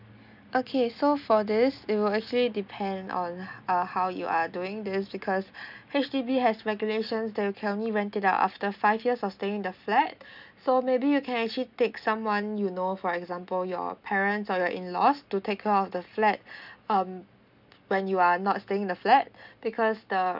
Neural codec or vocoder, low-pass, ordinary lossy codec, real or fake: none; 5.4 kHz; none; real